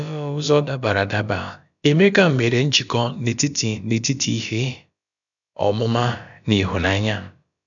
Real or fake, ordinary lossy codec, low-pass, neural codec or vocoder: fake; none; 7.2 kHz; codec, 16 kHz, about 1 kbps, DyCAST, with the encoder's durations